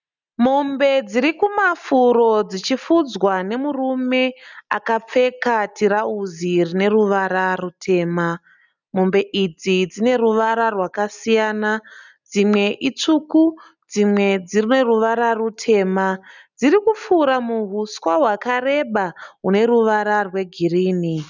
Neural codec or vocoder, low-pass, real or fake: none; 7.2 kHz; real